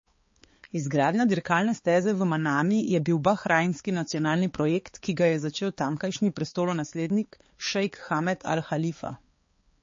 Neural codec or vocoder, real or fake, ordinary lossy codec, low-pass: codec, 16 kHz, 4 kbps, X-Codec, HuBERT features, trained on balanced general audio; fake; MP3, 32 kbps; 7.2 kHz